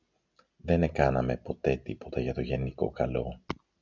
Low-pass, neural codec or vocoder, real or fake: 7.2 kHz; none; real